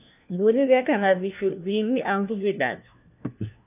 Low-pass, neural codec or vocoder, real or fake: 3.6 kHz; codec, 16 kHz, 1 kbps, FunCodec, trained on LibriTTS, 50 frames a second; fake